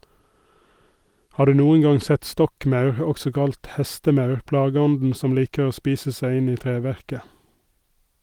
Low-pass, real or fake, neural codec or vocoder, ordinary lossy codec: 19.8 kHz; real; none; Opus, 24 kbps